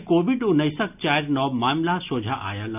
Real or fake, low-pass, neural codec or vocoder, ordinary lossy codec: real; 3.6 kHz; none; none